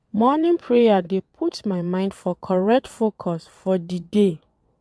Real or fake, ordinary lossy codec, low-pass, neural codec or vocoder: fake; none; none; vocoder, 22.05 kHz, 80 mel bands, WaveNeXt